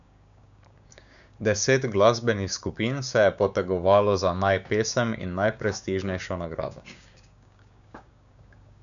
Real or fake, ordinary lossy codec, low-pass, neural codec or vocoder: fake; none; 7.2 kHz; codec, 16 kHz, 6 kbps, DAC